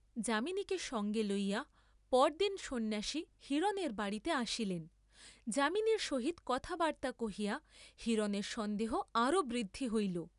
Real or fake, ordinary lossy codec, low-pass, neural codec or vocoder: real; none; 10.8 kHz; none